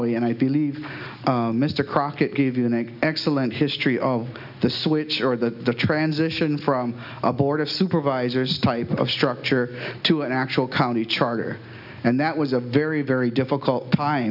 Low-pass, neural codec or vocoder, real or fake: 5.4 kHz; none; real